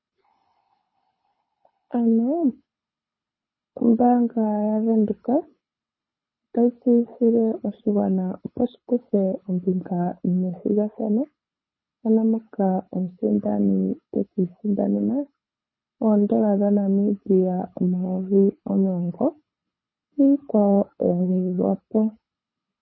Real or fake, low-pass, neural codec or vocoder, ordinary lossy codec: fake; 7.2 kHz; codec, 24 kHz, 6 kbps, HILCodec; MP3, 24 kbps